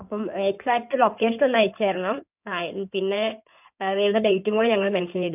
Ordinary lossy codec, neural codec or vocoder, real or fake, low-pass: none; codec, 16 kHz in and 24 kHz out, 2.2 kbps, FireRedTTS-2 codec; fake; 3.6 kHz